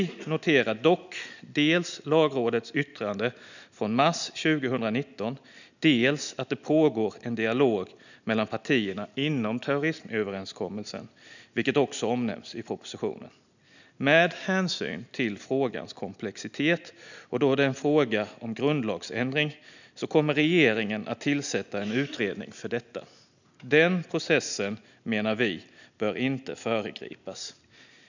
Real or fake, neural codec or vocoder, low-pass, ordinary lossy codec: real; none; 7.2 kHz; none